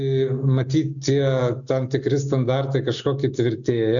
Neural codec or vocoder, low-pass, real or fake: none; 7.2 kHz; real